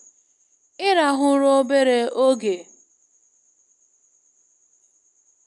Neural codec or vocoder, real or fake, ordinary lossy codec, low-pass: none; real; none; 10.8 kHz